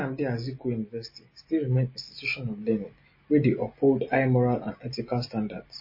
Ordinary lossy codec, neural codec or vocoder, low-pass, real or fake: MP3, 24 kbps; none; 5.4 kHz; real